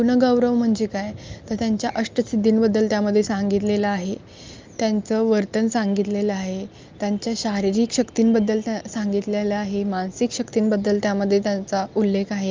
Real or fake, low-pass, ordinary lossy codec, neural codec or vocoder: real; 7.2 kHz; Opus, 24 kbps; none